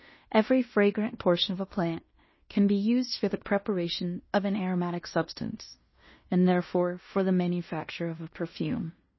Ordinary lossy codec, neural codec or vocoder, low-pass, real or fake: MP3, 24 kbps; codec, 16 kHz in and 24 kHz out, 0.9 kbps, LongCat-Audio-Codec, fine tuned four codebook decoder; 7.2 kHz; fake